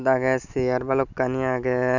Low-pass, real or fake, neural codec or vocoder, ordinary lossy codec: 7.2 kHz; real; none; none